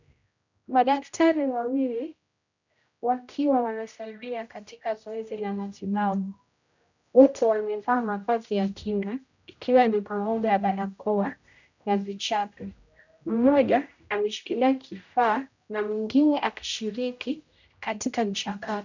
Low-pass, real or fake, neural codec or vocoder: 7.2 kHz; fake; codec, 16 kHz, 0.5 kbps, X-Codec, HuBERT features, trained on general audio